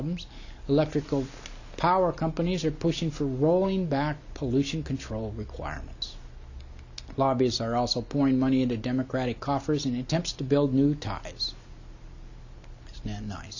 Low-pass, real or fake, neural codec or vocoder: 7.2 kHz; real; none